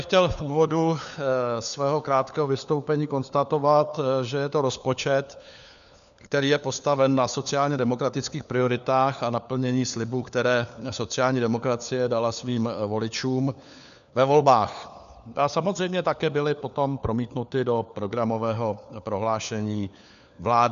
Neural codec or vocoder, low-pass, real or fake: codec, 16 kHz, 4 kbps, FunCodec, trained on LibriTTS, 50 frames a second; 7.2 kHz; fake